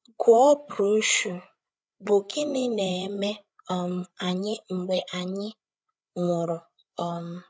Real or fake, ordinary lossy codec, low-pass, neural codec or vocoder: fake; none; none; codec, 16 kHz, 8 kbps, FreqCodec, larger model